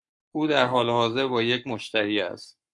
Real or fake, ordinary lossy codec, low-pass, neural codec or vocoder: fake; MP3, 64 kbps; 10.8 kHz; codec, 44.1 kHz, 7.8 kbps, Pupu-Codec